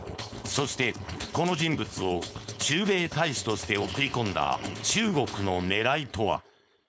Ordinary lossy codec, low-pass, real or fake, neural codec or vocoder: none; none; fake; codec, 16 kHz, 4.8 kbps, FACodec